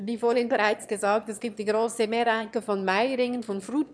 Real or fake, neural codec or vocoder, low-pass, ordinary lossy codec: fake; autoencoder, 22.05 kHz, a latent of 192 numbers a frame, VITS, trained on one speaker; none; none